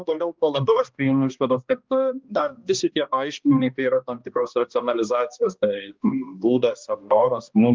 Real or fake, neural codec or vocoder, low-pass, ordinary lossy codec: fake; codec, 16 kHz, 1 kbps, X-Codec, HuBERT features, trained on balanced general audio; 7.2 kHz; Opus, 24 kbps